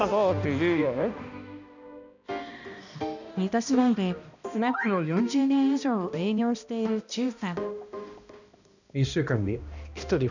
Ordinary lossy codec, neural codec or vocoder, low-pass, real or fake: none; codec, 16 kHz, 1 kbps, X-Codec, HuBERT features, trained on balanced general audio; 7.2 kHz; fake